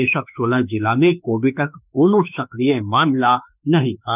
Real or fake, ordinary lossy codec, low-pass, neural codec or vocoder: fake; none; 3.6 kHz; autoencoder, 48 kHz, 32 numbers a frame, DAC-VAE, trained on Japanese speech